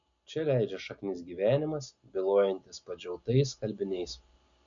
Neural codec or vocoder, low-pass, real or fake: none; 7.2 kHz; real